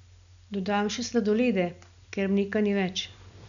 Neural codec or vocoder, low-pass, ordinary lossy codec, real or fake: none; 7.2 kHz; none; real